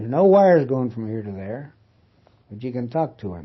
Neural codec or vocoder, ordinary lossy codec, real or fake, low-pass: none; MP3, 24 kbps; real; 7.2 kHz